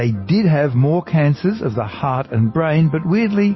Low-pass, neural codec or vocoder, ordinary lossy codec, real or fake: 7.2 kHz; none; MP3, 24 kbps; real